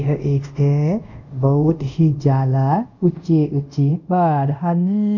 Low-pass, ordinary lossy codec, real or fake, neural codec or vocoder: 7.2 kHz; none; fake; codec, 24 kHz, 0.5 kbps, DualCodec